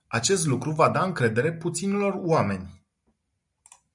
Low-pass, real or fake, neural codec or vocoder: 10.8 kHz; real; none